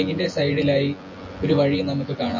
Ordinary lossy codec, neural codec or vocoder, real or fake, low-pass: MP3, 32 kbps; vocoder, 24 kHz, 100 mel bands, Vocos; fake; 7.2 kHz